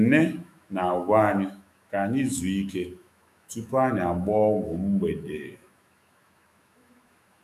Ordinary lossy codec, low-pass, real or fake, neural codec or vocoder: none; 14.4 kHz; fake; autoencoder, 48 kHz, 128 numbers a frame, DAC-VAE, trained on Japanese speech